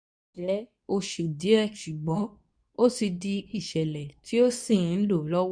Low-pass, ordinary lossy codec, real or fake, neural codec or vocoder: 9.9 kHz; none; fake; codec, 24 kHz, 0.9 kbps, WavTokenizer, medium speech release version 2